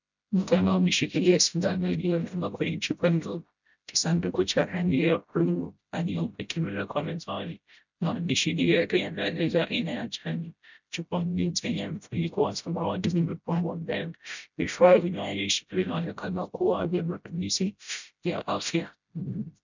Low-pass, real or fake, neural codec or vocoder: 7.2 kHz; fake; codec, 16 kHz, 0.5 kbps, FreqCodec, smaller model